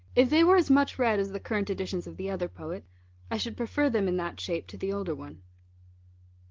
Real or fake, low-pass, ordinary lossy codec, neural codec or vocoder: real; 7.2 kHz; Opus, 16 kbps; none